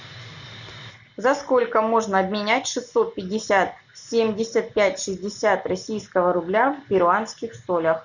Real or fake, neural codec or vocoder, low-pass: real; none; 7.2 kHz